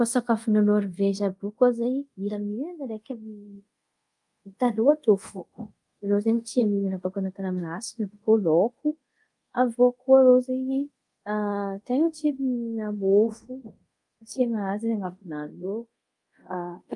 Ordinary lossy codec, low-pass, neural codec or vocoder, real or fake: Opus, 32 kbps; 10.8 kHz; codec, 24 kHz, 0.5 kbps, DualCodec; fake